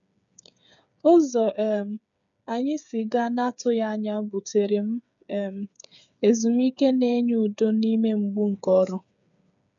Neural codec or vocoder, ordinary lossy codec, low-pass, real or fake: codec, 16 kHz, 8 kbps, FreqCodec, smaller model; none; 7.2 kHz; fake